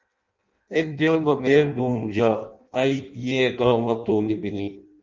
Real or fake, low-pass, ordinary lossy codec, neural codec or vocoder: fake; 7.2 kHz; Opus, 24 kbps; codec, 16 kHz in and 24 kHz out, 0.6 kbps, FireRedTTS-2 codec